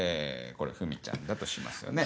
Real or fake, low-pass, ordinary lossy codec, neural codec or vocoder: real; none; none; none